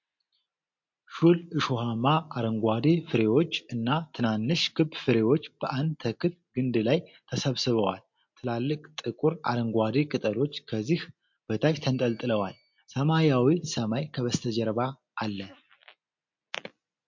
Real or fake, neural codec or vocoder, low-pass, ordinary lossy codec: real; none; 7.2 kHz; MP3, 48 kbps